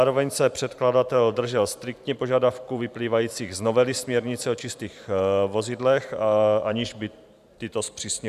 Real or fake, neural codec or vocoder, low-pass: fake; vocoder, 44.1 kHz, 128 mel bands every 512 samples, BigVGAN v2; 14.4 kHz